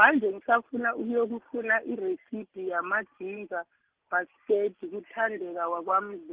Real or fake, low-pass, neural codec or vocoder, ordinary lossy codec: real; 3.6 kHz; none; Opus, 16 kbps